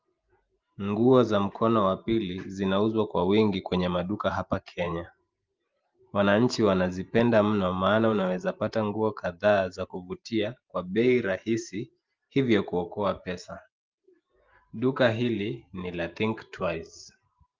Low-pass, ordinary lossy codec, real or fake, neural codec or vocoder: 7.2 kHz; Opus, 32 kbps; fake; vocoder, 44.1 kHz, 128 mel bands every 512 samples, BigVGAN v2